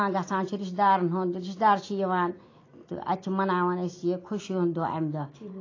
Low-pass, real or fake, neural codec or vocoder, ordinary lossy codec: 7.2 kHz; real; none; AAC, 32 kbps